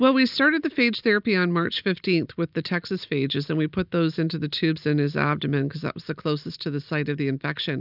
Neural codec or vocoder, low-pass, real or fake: none; 5.4 kHz; real